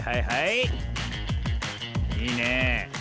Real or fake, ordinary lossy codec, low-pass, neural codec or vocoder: real; none; none; none